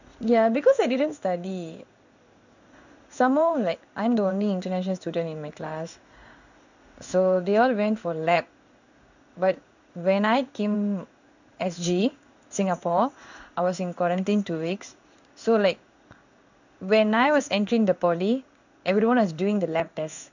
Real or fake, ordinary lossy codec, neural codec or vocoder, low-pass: fake; none; codec, 16 kHz in and 24 kHz out, 1 kbps, XY-Tokenizer; 7.2 kHz